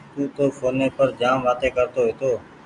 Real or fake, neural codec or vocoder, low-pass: real; none; 10.8 kHz